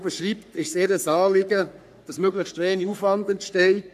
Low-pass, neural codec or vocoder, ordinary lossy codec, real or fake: 14.4 kHz; codec, 44.1 kHz, 3.4 kbps, Pupu-Codec; none; fake